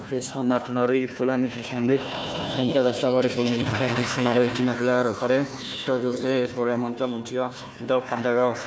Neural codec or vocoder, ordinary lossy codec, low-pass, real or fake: codec, 16 kHz, 1 kbps, FunCodec, trained on Chinese and English, 50 frames a second; none; none; fake